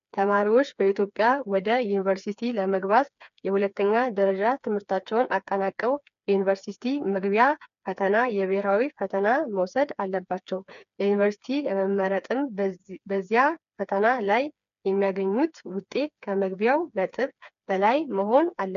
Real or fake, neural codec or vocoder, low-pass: fake; codec, 16 kHz, 4 kbps, FreqCodec, smaller model; 7.2 kHz